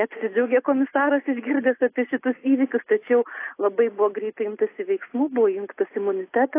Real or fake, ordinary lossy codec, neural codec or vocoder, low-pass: real; AAC, 24 kbps; none; 3.6 kHz